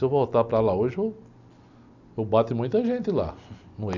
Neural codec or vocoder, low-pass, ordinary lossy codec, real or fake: none; 7.2 kHz; none; real